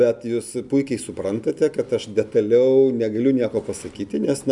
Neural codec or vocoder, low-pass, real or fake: none; 10.8 kHz; real